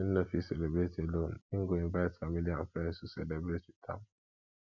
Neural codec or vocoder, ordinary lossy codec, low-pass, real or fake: none; none; 7.2 kHz; real